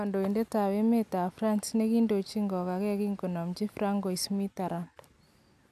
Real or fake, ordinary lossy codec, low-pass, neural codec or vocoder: real; none; 14.4 kHz; none